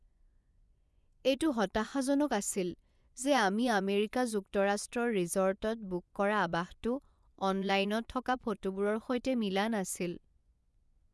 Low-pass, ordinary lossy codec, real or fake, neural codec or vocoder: none; none; fake; vocoder, 24 kHz, 100 mel bands, Vocos